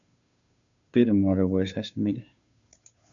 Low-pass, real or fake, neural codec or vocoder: 7.2 kHz; fake; codec, 16 kHz, 2 kbps, FunCodec, trained on Chinese and English, 25 frames a second